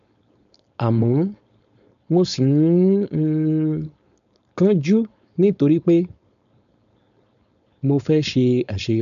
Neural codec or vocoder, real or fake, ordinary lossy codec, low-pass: codec, 16 kHz, 4.8 kbps, FACodec; fake; none; 7.2 kHz